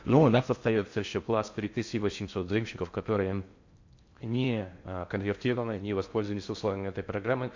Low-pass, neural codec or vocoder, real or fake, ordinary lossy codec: 7.2 kHz; codec, 16 kHz in and 24 kHz out, 0.6 kbps, FocalCodec, streaming, 4096 codes; fake; MP3, 48 kbps